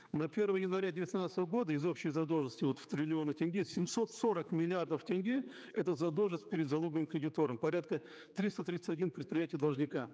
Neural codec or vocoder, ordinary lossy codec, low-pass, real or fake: codec, 16 kHz, 4 kbps, X-Codec, HuBERT features, trained on general audio; none; none; fake